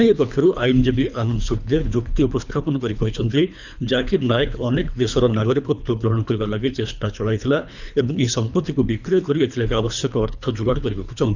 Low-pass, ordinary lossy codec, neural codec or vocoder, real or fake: 7.2 kHz; none; codec, 24 kHz, 3 kbps, HILCodec; fake